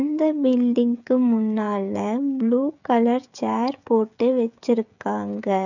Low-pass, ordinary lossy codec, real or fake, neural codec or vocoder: 7.2 kHz; none; fake; codec, 16 kHz, 16 kbps, FreqCodec, smaller model